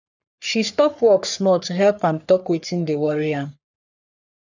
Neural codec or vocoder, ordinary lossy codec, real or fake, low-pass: codec, 44.1 kHz, 3.4 kbps, Pupu-Codec; none; fake; 7.2 kHz